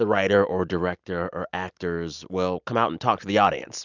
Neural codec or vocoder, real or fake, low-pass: none; real; 7.2 kHz